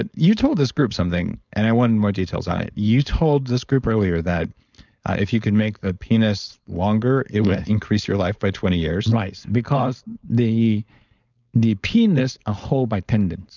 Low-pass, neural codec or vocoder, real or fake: 7.2 kHz; codec, 16 kHz, 4.8 kbps, FACodec; fake